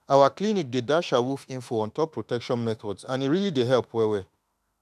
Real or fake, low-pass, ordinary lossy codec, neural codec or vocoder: fake; 14.4 kHz; none; autoencoder, 48 kHz, 32 numbers a frame, DAC-VAE, trained on Japanese speech